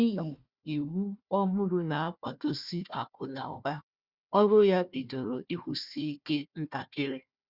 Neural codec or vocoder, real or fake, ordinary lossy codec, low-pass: codec, 16 kHz, 1 kbps, FunCodec, trained on Chinese and English, 50 frames a second; fake; Opus, 64 kbps; 5.4 kHz